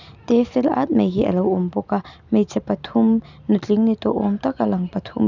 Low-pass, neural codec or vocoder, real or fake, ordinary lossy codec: 7.2 kHz; none; real; none